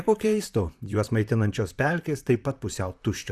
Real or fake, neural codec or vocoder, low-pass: fake; vocoder, 44.1 kHz, 128 mel bands, Pupu-Vocoder; 14.4 kHz